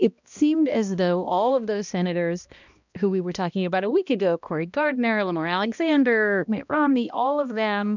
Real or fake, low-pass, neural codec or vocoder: fake; 7.2 kHz; codec, 16 kHz, 1 kbps, X-Codec, HuBERT features, trained on balanced general audio